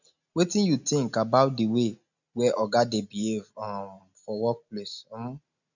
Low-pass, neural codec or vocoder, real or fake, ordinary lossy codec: 7.2 kHz; none; real; none